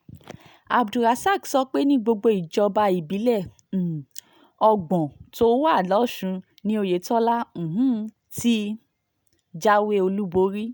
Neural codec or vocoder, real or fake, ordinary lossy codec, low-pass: none; real; none; none